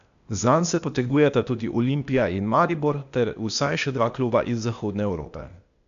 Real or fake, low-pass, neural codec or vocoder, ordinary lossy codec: fake; 7.2 kHz; codec, 16 kHz, 0.8 kbps, ZipCodec; none